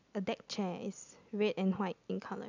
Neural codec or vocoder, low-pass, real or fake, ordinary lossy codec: none; 7.2 kHz; real; none